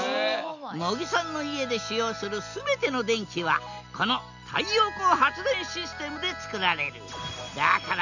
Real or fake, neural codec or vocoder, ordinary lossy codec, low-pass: real; none; none; 7.2 kHz